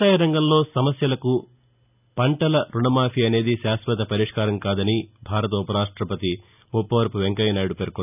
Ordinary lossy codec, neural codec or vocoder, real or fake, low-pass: none; none; real; 3.6 kHz